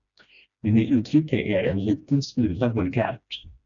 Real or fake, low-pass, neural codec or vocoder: fake; 7.2 kHz; codec, 16 kHz, 1 kbps, FreqCodec, smaller model